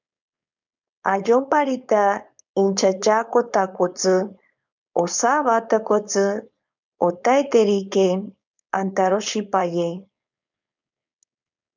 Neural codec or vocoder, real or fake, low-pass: codec, 16 kHz, 4.8 kbps, FACodec; fake; 7.2 kHz